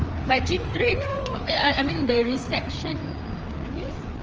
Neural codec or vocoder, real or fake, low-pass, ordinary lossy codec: codec, 16 kHz, 4 kbps, FreqCodec, larger model; fake; 7.2 kHz; Opus, 24 kbps